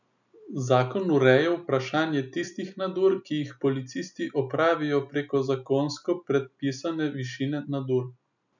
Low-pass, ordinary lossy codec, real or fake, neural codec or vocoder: 7.2 kHz; none; real; none